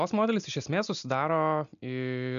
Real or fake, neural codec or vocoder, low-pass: real; none; 7.2 kHz